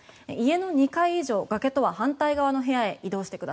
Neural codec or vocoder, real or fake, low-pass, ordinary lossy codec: none; real; none; none